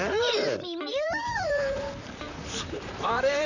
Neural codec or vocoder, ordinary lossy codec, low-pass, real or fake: vocoder, 44.1 kHz, 128 mel bands, Pupu-Vocoder; none; 7.2 kHz; fake